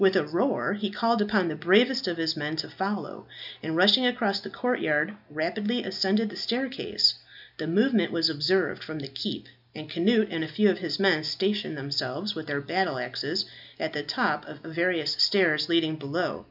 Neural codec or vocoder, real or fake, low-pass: none; real; 5.4 kHz